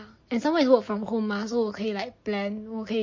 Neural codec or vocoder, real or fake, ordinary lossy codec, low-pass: none; real; MP3, 32 kbps; 7.2 kHz